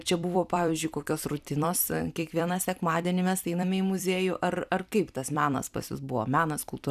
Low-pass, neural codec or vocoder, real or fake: 14.4 kHz; vocoder, 44.1 kHz, 128 mel bands every 256 samples, BigVGAN v2; fake